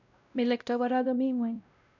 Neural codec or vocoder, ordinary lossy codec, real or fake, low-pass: codec, 16 kHz, 0.5 kbps, X-Codec, WavLM features, trained on Multilingual LibriSpeech; none; fake; 7.2 kHz